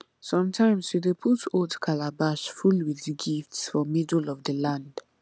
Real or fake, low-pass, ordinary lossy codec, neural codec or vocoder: real; none; none; none